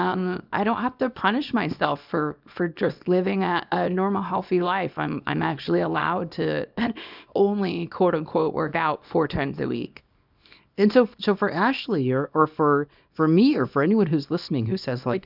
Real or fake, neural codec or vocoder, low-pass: fake; codec, 24 kHz, 0.9 kbps, WavTokenizer, small release; 5.4 kHz